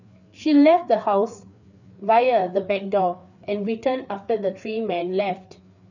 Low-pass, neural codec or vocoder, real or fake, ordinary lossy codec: 7.2 kHz; codec, 16 kHz, 4 kbps, FreqCodec, larger model; fake; none